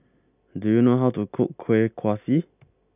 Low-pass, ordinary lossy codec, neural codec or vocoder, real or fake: 3.6 kHz; none; none; real